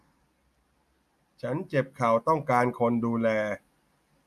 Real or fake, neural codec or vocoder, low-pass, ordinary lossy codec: real; none; 14.4 kHz; none